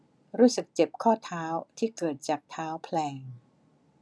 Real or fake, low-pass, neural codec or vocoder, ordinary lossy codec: real; none; none; none